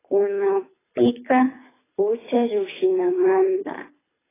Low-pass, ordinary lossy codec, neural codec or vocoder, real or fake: 3.6 kHz; AAC, 16 kbps; codec, 24 kHz, 3 kbps, HILCodec; fake